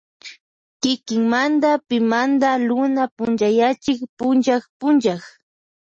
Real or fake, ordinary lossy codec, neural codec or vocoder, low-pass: real; MP3, 32 kbps; none; 9.9 kHz